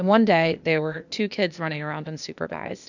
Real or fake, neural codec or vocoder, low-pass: fake; codec, 16 kHz, 0.8 kbps, ZipCodec; 7.2 kHz